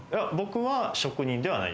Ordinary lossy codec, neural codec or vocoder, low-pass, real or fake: none; none; none; real